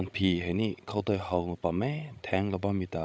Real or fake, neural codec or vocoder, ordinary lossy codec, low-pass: fake; codec, 16 kHz, 16 kbps, FreqCodec, larger model; none; none